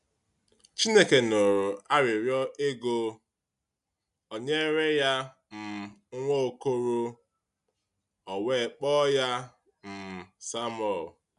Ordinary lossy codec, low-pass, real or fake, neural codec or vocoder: none; 10.8 kHz; real; none